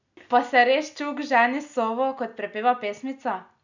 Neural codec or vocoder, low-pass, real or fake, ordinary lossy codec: none; 7.2 kHz; real; none